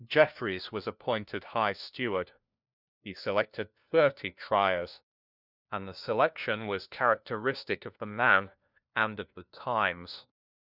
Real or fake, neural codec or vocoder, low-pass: fake; codec, 16 kHz, 1 kbps, FunCodec, trained on LibriTTS, 50 frames a second; 5.4 kHz